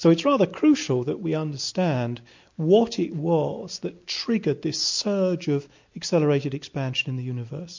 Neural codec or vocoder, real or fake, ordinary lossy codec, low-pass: none; real; MP3, 48 kbps; 7.2 kHz